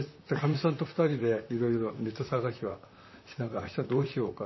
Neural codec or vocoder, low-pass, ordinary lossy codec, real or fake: vocoder, 44.1 kHz, 128 mel bands, Pupu-Vocoder; 7.2 kHz; MP3, 24 kbps; fake